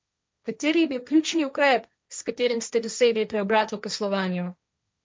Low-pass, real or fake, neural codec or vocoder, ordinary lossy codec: none; fake; codec, 16 kHz, 1.1 kbps, Voila-Tokenizer; none